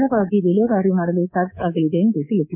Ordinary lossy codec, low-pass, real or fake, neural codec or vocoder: MP3, 32 kbps; 3.6 kHz; fake; codec, 24 kHz, 3.1 kbps, DualCodec